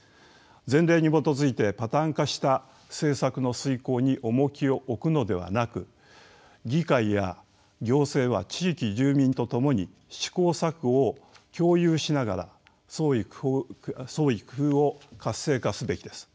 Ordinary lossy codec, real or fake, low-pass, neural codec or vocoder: none; real; none; none